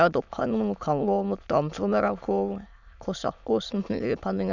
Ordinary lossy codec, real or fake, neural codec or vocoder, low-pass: none; fake; autoencoder, 22.05 kHz, a latent of 192 numbers a frame, VITS, trained on many speakers; 7.2 kHz